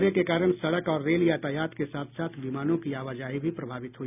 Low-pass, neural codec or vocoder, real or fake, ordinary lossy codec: 3.6 kHz; none; real; none